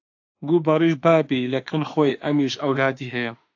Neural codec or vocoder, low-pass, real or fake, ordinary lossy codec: autoencoder, 48 kHz, 32 numbers a frame, DAC-VAE, trained on Japanese speech; 7.2 kHz; fake; AAC, 48 kbps